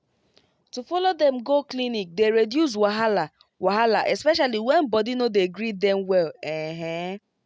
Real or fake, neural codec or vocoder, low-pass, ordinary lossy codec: real; none; none; none